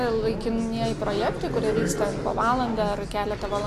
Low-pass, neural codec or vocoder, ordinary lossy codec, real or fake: 14.4 kHz; none; AAC, 48 kbps; real